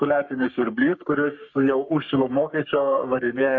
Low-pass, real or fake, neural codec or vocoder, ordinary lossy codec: 7.2 kHz; fake; codec, 44.1 kHz, 3.4 kbps, Pupu-Codec; MP3, 48 kbps